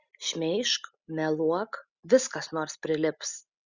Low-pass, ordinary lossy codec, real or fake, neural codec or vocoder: 7.2 kHz; Opus, 64 kbps; real; none